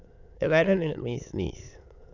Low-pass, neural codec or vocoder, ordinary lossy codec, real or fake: 7.2 kHz; autoencoder, 22.05 kHz, a latent of 192 numbers a frame, VITS, trained on many speakers; none; fake